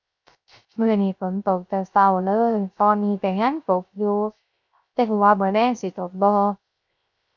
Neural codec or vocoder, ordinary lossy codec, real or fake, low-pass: codec, 16 kHz, 0.3 kbps, FocalCodec; none; fake; 7.2 kHz